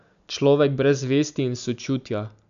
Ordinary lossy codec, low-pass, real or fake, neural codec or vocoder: none; 7.2 kHz; real; none